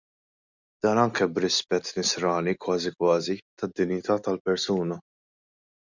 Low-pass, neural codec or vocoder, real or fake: 7.2 kHz; none; real